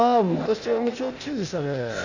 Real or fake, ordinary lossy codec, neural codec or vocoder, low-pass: fake; none; codec, 16 kHz, 0.8 kbps, ZipCodec; 7.2 kHz